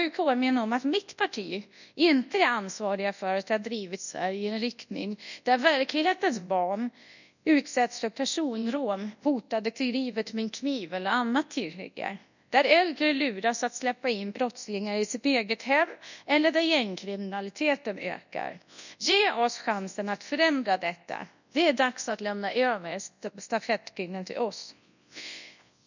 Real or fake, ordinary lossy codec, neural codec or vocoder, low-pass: fake; none; codec, 24 kHz, 0.9 kbps, WavTokenizer, large speech release; 7.2 kHz